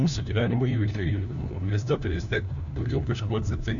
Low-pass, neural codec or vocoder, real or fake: 7.2 kHz; codec, 16 kHz, 1 kbps, FunCodec, trained on LibriTTS, 50 frames a second; fake